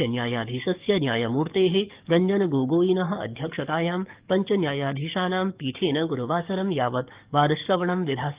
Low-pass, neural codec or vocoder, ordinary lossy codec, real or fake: 3.6 kHz; codec, 44.1 kHz, 7.8 kbps, DAC; Opus, 32 kbps; fake